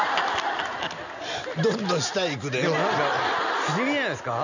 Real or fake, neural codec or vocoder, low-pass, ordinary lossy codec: real; none; 7.2 kHz; none